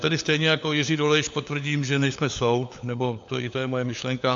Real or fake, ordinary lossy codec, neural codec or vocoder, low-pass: fake; AAC, 64 kbps; codec, 16 kHz, 4 kbps, FunCodec, trained on LibriTTS, 50 frames a second; 7.2 kHz